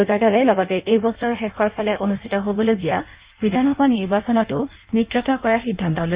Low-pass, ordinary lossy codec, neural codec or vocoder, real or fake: 3.6 kHz; Opus, 64 kbps; codec, 16 kHz in and 24 kHz out, 1.1 kbps, FireRedTTS-2 codec; fake